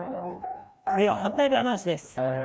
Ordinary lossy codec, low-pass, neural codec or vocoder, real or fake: none; none; codec, 16 kHz, 1 kbps, FreqCodec, larger model; fake